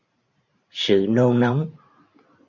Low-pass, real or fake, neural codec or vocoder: 7.2 kHz; fake; vocoder, 44.1 kHz, 128 mel bands every 512 samples, BigVGAN v2